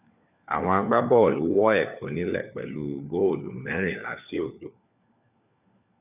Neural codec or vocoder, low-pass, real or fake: codec, 16 kHz, 4 kbps, FunCodec, trained on LibriTTS, 50 frames a second; 3.6 kHz; fake